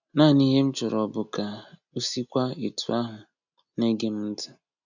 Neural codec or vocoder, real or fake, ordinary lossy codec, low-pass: none; real; none; 7.2 kHz